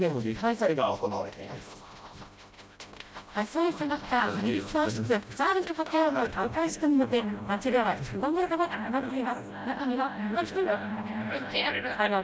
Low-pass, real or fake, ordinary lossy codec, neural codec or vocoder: none; fake; none; codec, 16 kHz, 0.5 kbps, FreqCodec, smaller model